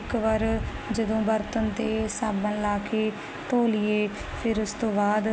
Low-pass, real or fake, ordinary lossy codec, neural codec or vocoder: none; real; none; none